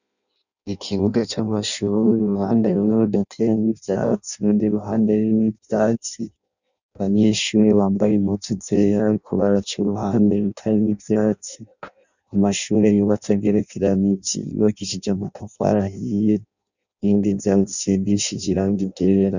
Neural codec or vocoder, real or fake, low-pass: codec, 16 kHz in and 24 kHz out, 0.6 kbps, FireRedTTS-2 codec; fake; 7.2 kHz